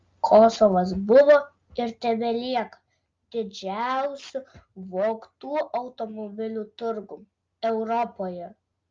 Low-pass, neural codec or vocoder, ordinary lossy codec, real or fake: 7.2 kHz; none; Opus, 32 kbps; real